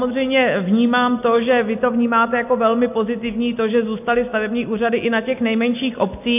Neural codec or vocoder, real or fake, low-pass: none; real; 3.6 kHz